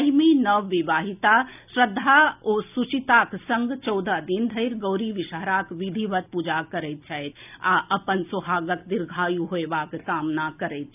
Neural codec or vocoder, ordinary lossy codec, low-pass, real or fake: none; none; 3.6 kHz; real